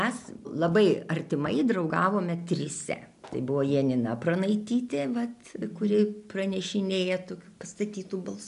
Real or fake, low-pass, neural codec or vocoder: real; 10.8 kHz; none